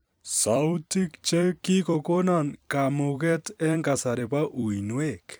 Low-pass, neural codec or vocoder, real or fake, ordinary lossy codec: none; vocoder, 44.1 kHz, 128 mel bands every 256 samples, BigVGAN v2; fake; none